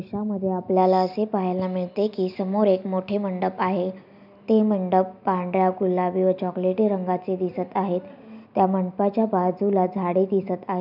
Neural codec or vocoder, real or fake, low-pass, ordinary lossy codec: none; real; 5.4 kHz; none